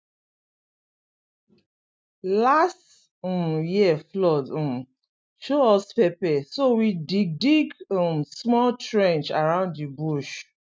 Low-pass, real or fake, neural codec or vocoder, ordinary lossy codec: none; real; none; none